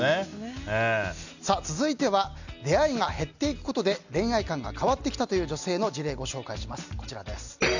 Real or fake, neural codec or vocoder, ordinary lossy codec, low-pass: real; none; none; 7.2 kHz